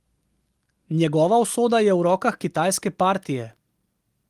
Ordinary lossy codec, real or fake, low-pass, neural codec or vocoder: Opus, 24 kbps; real; 14.4 kHz; none